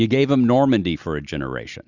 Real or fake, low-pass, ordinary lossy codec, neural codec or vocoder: real; 7.2 kHz; Opus, 64 kbps; none